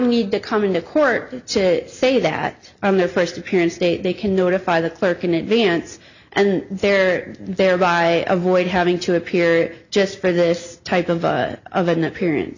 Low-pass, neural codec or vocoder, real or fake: 7.2 kHz; none; real